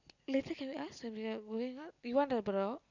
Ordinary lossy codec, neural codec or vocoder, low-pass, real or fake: none; none; 7.2 kHz; real